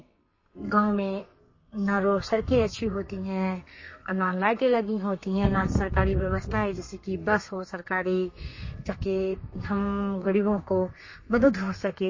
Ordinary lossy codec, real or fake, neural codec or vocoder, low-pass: MP3, 32 kbps; fake; codec, 32 kHz, 1.9 kbps, SNAC; 7.2 kHz